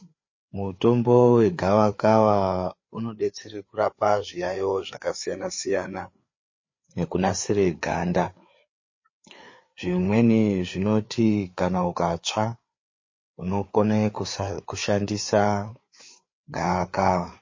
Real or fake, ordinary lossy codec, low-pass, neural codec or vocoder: fake; MP3, 32 kbps; 7.2 kHz; codec, 16 kHz, 4 kbps, FreqCodec, larger model